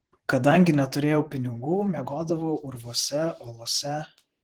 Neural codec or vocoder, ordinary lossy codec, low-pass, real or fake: vocoder, 44.1 kHz, 128 mel bands, Pupu-Vocoder; Opus, 16 kbps; 19.8 kHz; fake